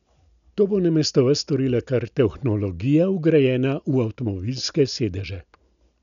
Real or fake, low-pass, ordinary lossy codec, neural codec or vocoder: real; 7.2 kHz; none; none